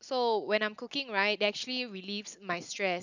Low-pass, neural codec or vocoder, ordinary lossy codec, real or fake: 7.2 kHz; none; none; real